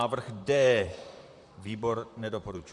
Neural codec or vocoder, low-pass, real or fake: vocoder, 44.1 kHz, 128 mel bands, Pupu-Vocoder; 10.8 kHz; fake